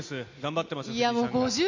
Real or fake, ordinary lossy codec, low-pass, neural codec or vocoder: real; MP3, 64 kbps; 7.2 kHz; none